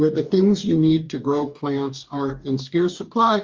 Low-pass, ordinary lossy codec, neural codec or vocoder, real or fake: 7.2 kHz; Opus, 32 kbps; codec, 44.1 kHz, 2.6 kbps, DAC; fake